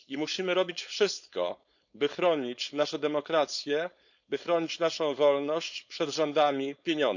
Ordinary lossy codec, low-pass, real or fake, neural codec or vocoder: none; 7.2 kHz; fake; codec, 16 kHz, 4.8 kbps, FACodec